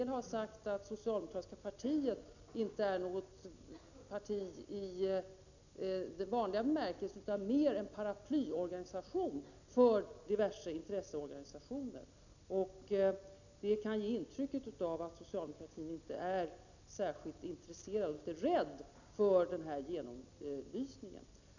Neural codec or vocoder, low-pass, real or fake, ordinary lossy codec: none; 7.2 kHz; real; none